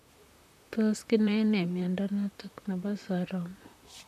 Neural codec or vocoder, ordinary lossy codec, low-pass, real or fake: vocoder, 44.1 kHz, 128 mel bands, Pupu-Vocoder; none; 14.4 kHz; fake